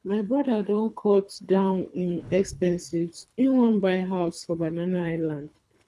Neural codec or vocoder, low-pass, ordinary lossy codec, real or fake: codec, 24 kHz, 3 kbps, HILCodec; none; none; fake